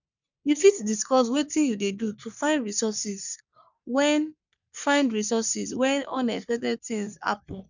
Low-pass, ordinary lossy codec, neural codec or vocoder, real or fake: 7.2 kHz; none; codec, 44.1 kHz, 3.4 kbps, Pupu-Codec; fake